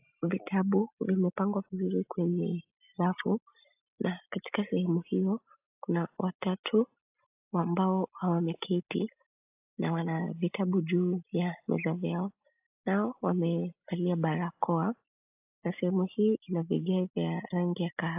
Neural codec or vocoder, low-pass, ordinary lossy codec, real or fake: none; 3.6 kHz; AAC, 32 kbps; real